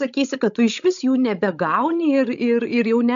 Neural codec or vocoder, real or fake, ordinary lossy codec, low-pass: codec, 16 kHz, 16 kbps, FreqCodec, larger model; fake; MP3, 64 kbps; 7.2 kHz